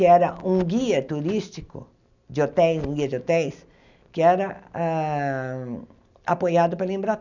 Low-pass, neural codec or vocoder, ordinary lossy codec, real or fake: 7.2 kHz; none; none; real